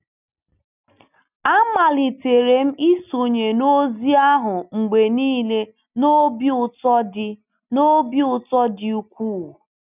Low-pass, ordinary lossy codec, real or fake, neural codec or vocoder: 3.6 kHz; none; real; none